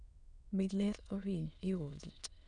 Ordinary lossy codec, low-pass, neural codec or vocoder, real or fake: none; 9.9 kHz; autoencoder, 22.05 kHz, a latent of 192 numbers a frame, VITS, trained on many speakers; fake